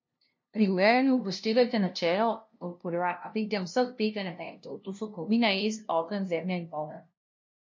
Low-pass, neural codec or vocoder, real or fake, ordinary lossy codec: 7.2 kHz; codec, 16 kHz, 0.5 kbps, FunCodec, trained on LibriTTS, 25 frames a second; fake; MP3, 48 kbps